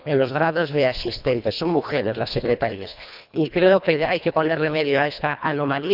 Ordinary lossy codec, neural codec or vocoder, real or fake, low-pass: none; codec, 24 kHz, 1.5 kbps, HILCodec; fake; 5.4 kHz